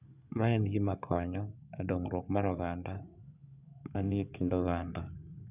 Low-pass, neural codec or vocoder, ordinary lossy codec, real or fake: 3.6 kHz; codec, 16 kHz in and 24 kHz out, 2.2 kbps, FireRedTTS-2 codec; none; fake